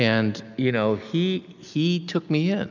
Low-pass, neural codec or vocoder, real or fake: 7.2 kHz; none; real